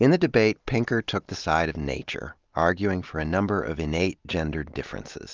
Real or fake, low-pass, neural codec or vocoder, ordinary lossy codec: real; 7.2 kHz; none; Opus, 32 kbps